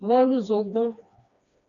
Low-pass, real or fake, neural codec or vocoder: 7.2 kHz; fake; codec, 16 kHz, 2 kbps, FreqCodec, smaller model